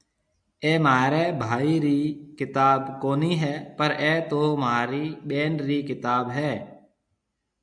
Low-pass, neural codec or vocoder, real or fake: 9.9 kHz; none; real